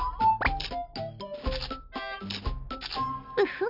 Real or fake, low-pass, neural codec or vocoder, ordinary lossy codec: real; 5.4 kHz; none; none